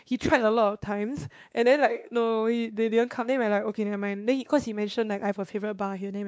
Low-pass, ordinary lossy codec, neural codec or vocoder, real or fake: none; none; codec, 16 kHz, 2 kbps, X-Codec, WavLM features, trained on Multilingual LibriSpeech; fake